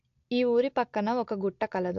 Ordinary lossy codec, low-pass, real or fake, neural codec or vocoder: AAC, 48 kbps; 7.2 kHz; real; none